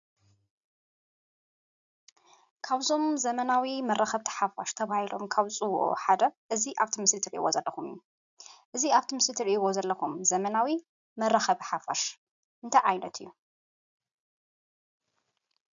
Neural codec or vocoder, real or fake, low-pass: none; real; 7.2 kHz